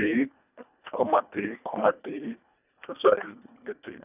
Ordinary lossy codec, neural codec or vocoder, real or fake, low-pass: none; codec, 24 kHz, 1.5 kbps, HILCodec; fake; 3.6 kHz